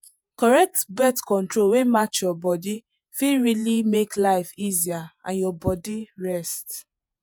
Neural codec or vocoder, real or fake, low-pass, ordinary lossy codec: vocoder, 48 kHz, 128 mel bands, Vocos; fake; none; none